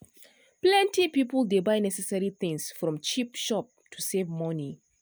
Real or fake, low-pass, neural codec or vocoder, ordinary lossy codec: real; none; none; none